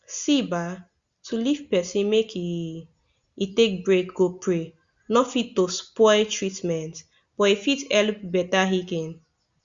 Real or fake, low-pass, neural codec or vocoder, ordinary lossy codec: real; 7.2 kHz; none; Opus, 64 kbps